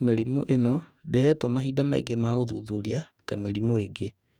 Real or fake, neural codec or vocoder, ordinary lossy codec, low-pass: fake; codec, 44.1 kHz, 2.6 kbps, DAC; none; 19.8 kHz